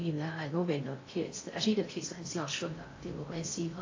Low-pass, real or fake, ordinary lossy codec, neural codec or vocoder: 7.2 kHz; fake; AAC, 32 kbps; codec, 16 kHz in and 24 kHz out, 0.6 kbps, FocalCodec, streaming, 4096 codes